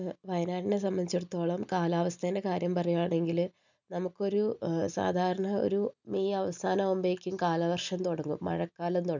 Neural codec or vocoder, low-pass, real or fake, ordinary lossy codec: none; 7.2 kHz; real; none